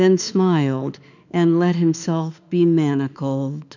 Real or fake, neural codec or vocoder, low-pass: fake; autoencoder, 48 kHz, 32 numbers a frame, DAC-VAE, trained on Japanese speech; 7.2 kHz